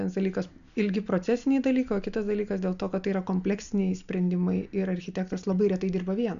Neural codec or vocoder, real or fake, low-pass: none; real; 7.2 kHz